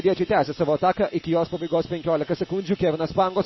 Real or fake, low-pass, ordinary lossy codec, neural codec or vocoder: real; 7.2 kHz; MP3, 24 kbps; none